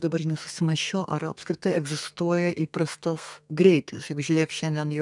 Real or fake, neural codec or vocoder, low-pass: fake; codec, 32 kHz, 1.9 kbps, SNAC; 10.8 kHz